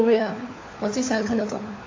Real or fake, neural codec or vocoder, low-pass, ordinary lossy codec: fake; codec, 16 kHz, 4 kbps, FunCodec, trained on LibriTTS, 50 frames a second; 7.2 kHz; AAC, 32 kbps